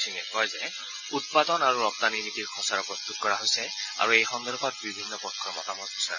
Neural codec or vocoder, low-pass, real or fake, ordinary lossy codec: none; 7.2 kHz; real; none